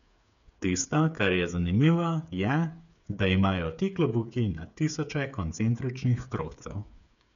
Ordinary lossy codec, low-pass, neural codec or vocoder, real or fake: none; 7.2 kHz; codec, 16 kHz, 8 kbps, FreqCodec, smaller model; fake